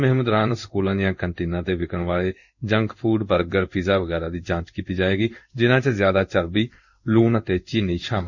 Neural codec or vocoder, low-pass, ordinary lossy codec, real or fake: codec, 16 kHz in and 24 kHz out, 1 kbps, XY-Tokenizer; 7.2 kHz; none; fake